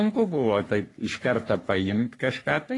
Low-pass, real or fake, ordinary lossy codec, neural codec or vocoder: 10.8 kHz; fake; AAC, 32 kbps; codec, 44.1 kHz, 3.4 kbps, Pupu-Codec